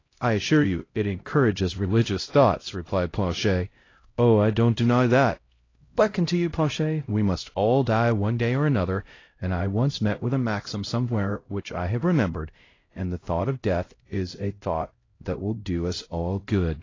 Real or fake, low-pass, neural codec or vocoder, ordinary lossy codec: fake; 7.2 kHz; codec, 16 kHz, 0.5 kbps, X-Codec, HuBERT features, trained on LibriSpeech; AAC, 32 kbps